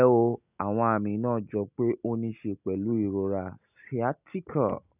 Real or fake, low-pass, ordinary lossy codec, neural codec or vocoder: real; 3.6 kHz; none; none